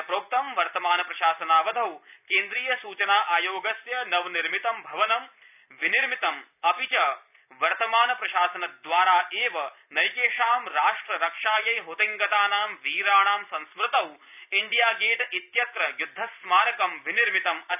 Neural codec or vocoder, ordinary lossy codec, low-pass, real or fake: none; none; 3.6 kHz; real